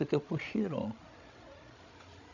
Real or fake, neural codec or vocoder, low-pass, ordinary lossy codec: fake; codec, 16 kHz, 16 kbps, FreqCodec, larger model; 7.2 kHz; none